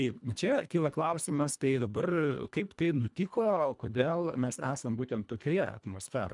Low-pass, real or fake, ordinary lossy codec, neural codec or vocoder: 10.8 kHz; fake; MP3, 96 kbps; codec, 24 kHz, 1.5 kbps, HILCodec